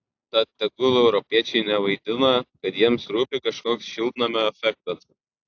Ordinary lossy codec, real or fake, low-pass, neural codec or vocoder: AAC, 48 kbps; real; 7.2 kHz; none